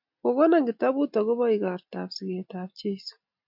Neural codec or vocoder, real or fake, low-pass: none; real; 5.4 kHz